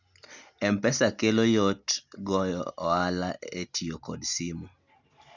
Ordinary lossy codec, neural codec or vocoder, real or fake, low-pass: none; none; real; 7.2 kHz